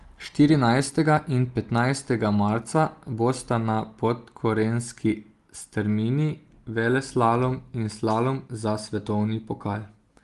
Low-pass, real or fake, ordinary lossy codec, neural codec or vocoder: 10.8 kHz; real; Opus, 24 kbps; none